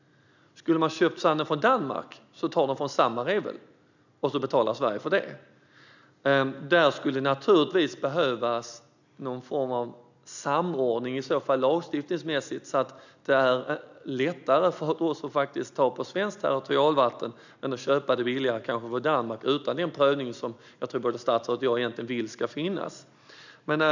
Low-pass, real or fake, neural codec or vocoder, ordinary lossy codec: 7.2 kHz; real; none; none